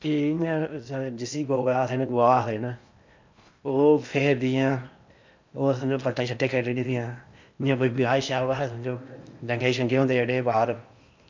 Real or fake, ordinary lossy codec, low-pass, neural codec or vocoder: fake; MP3, 64 kbps; 7.2 kHz; codec, 16 kHz in and 24 kHz out, 0.8 kbps, FocalCodec, streaming, 65536 codes